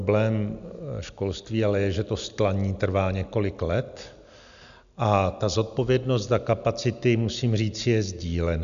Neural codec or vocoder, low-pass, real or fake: none; 7.2 kHz; real